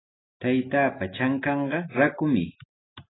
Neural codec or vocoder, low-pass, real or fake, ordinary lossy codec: none; 7.2 kHz; real; AAC, 16 kbps